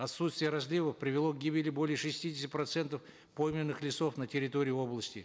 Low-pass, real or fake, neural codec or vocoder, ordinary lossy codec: none; real; none; none